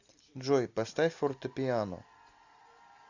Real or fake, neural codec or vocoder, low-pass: real; none; 7.2 kHz